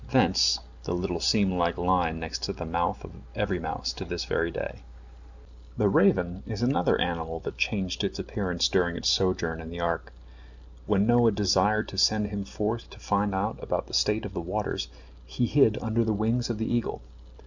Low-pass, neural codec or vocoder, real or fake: 7.2 kHz; none; real